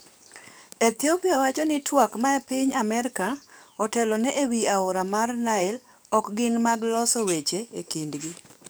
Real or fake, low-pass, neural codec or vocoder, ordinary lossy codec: fake; none; codec, 44.1 kHz, 7.8 kbps, DAC; none